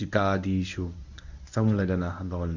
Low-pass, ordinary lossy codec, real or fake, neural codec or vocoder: 7.2 kHz; Opus, 64 kbps; fake; codec, 24 kHz, 0.9 kbps, WavTokenizer, medium speech release version 1